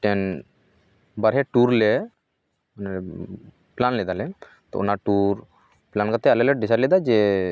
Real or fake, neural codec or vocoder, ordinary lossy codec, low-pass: real; none; none; none